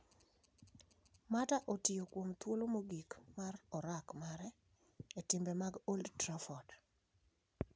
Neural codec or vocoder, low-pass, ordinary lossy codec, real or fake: none; none; none; real